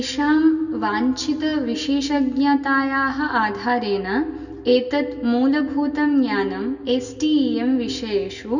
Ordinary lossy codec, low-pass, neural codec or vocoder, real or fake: none; 7.2 kHz; none; real